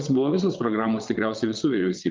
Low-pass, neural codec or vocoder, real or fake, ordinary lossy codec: 7.2 kHz; vocoder, 22.05 kHz, 80 mel bands, WaveNeXt; fake; Opus, 32 kbps